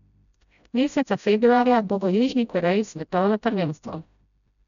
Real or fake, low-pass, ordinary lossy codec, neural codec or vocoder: fake; 7.2 kHz; none; codec, 16 kHz, 0.5 kbps, FreqCodec, smaller model